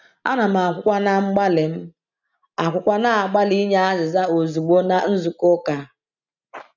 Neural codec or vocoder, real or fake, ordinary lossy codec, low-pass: none; real; none; 7.2 kHz